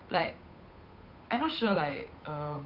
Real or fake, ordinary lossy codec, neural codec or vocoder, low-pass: fake; none; codec, 16 kHz, 8 kbps, FunCodec, trained on Chinese and English, 25 frames a second; 5.4 kHz